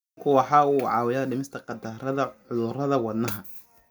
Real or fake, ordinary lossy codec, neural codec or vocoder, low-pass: real; none; none; none